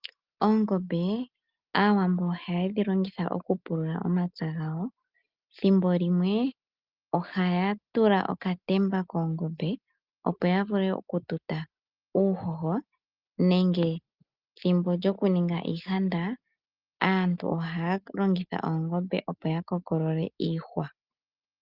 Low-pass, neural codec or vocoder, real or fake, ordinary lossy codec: 5.4 kHz; none; real; Opus, 24 kbps